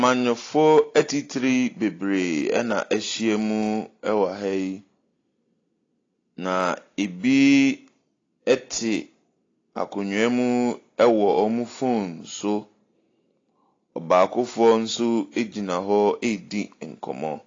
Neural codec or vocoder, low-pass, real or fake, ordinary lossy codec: none; 7.2 kHz; real; AAC, 32 kbps